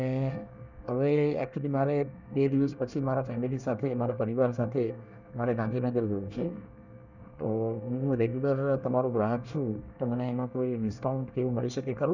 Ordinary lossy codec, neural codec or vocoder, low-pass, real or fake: none; codec, 24 kHz, 1 kbps, SNAC; 7.2 kHz; fake